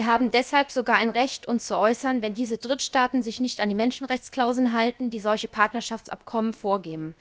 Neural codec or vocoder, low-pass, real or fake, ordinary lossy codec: codec, 16 kHz, about 1 kbps, DyCAST, with the encoder's durations; none; fake; none